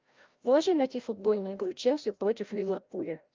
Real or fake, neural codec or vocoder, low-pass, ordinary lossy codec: fake; codec, 16 kHz, 0.5 kbps, FreqCodec, larger model; 7.2 kHz; Opus, 32 kbps